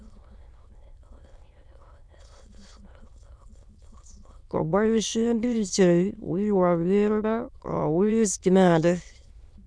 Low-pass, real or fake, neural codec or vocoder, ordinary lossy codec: none; fake; autoencoder, 22.05 kHz, a latent of 192 numbers a frame, VITS, trained on many speakers; none